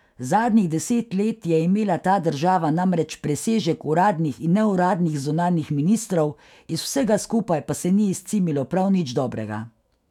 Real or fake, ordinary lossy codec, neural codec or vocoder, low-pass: fake; none; autoencoder, 48 kHz, 128 numbers a frame, DAC-VAE, trained on Japanese speech; 19.8 kHz